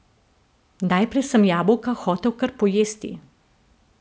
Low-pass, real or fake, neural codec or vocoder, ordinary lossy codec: none; real; none; none